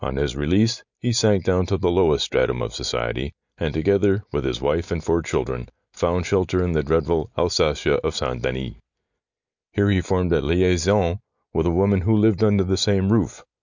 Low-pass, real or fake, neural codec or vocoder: 7.2 kHz; real; none